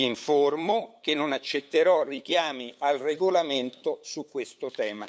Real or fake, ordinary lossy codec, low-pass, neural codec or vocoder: fake; none; none; codec, 16 kHz, 8 kbps, FunCodec, trained on LibriTTS, 25 frames a second